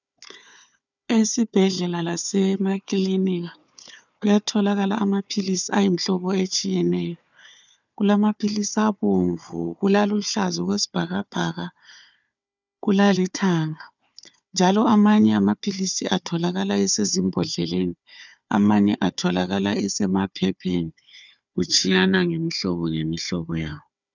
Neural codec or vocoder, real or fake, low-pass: codec, 16 kHz, 4 kbps, FunCodec, trained on Chinese and English, 50 frames a second; fake; 7.2 kHz